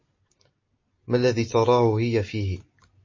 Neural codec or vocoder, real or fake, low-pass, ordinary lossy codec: none; real; 7.2 kHz; MP3, 32 kbps